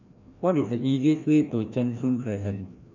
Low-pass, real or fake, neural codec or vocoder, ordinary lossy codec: 7.2 kHz; fake; codec, 16 kHz, 1 kbps, FreqCodec, larger model; none